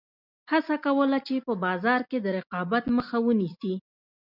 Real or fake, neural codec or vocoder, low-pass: real; none; 5.4 kHz